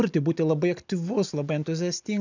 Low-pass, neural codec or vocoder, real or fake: 7.2 kHz; none; real